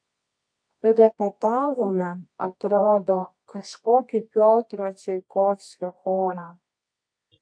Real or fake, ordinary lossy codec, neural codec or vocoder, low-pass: fake; AAC, 64 kbps; codec, 24 kHz, 0.9 kbps, WavTokenizer, medium music audio release; 9.9 kHz